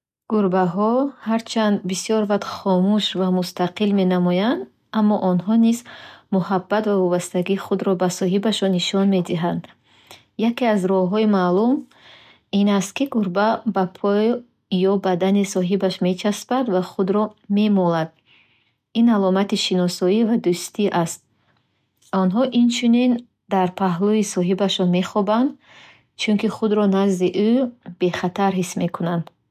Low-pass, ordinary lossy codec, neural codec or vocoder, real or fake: 14.4 kHz; none; none; real